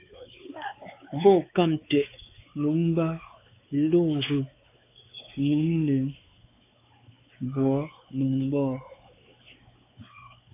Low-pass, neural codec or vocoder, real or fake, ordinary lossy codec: 3.6 kHz; codec, 16 kHz, 4 kbps, X-Codec, WavLM features, trained on Multilingual LibriSpeech; fake; AAC, 24 kbps